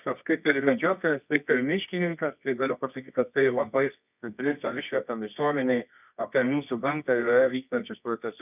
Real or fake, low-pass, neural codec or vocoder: fake; 3.6 kHz; codec, 24 kHz, 0.9 kbps, WavTokenizer, medium music audio release